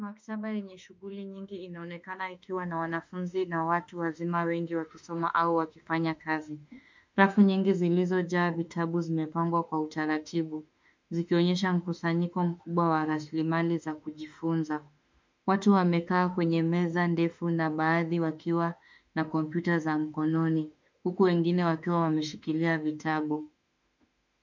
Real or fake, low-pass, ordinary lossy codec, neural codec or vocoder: fake; 7.2 kHz; MP3, 64 kbps; autoencoder, 48 kHz, 32 numbers a frame, DAC-VAE, trained on Japanese speech